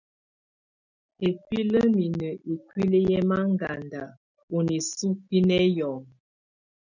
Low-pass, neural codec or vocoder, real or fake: 7.2 kHz; none; real